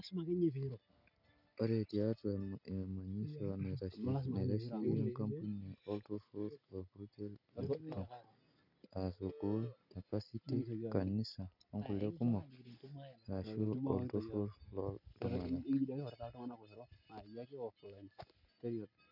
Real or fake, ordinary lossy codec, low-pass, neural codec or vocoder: real; none; 5.4 kHz; none